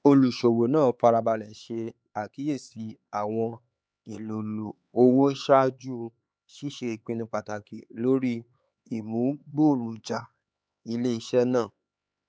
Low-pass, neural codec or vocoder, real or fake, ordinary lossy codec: none; codec, 16 kHz, 4 kbps, X-Codec, HuBERT features, trained on LibriSpeech; fake; none